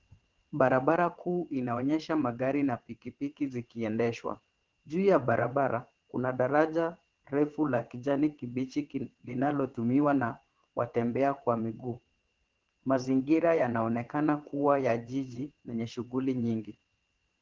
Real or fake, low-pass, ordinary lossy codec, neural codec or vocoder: fake; 7.2 kHz; Opus, 16 kbps; vocoder, 22.05 kHz, 80 mel bands, WaveNeXt